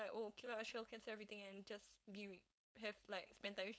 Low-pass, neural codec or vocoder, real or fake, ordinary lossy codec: none; codec, 16 kHz, 4.8 kbps, FACodec; fake; none